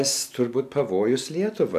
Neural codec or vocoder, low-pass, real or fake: none; 14.4 kHz; real